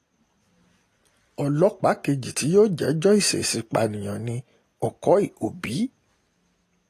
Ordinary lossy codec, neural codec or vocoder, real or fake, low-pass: AAC, 48 kbps; none; real; 14.4 kHz